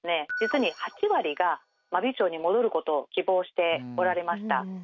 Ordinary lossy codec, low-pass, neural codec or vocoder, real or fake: none; 7.2 kHz; none; real